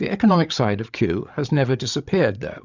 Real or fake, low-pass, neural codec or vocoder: fake; 7.2 kHz; codec, 16 kHz, 8 kbps, FreqCodec, larger model